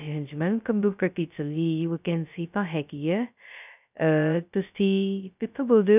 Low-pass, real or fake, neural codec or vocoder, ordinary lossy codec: 3.6 kHz; fake; codec, 16 kHz, 0.2 kbps, FocalCodec; none